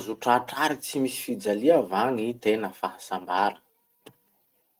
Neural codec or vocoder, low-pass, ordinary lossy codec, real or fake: none; 19.8 kHz; Opus, 24 kbps; real